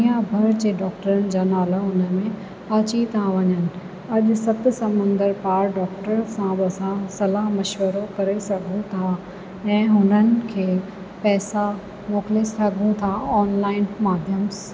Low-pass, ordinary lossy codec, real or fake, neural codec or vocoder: none; none; real; none